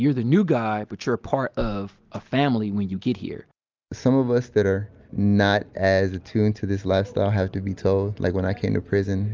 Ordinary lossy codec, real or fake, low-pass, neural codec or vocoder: Opus, 24 kbps; real; 7.2 kHz; none